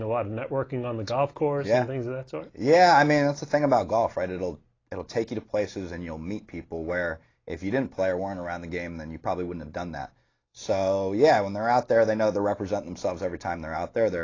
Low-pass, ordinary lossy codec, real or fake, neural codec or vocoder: 7.2 kHz; AAC, 32 kbps; real; none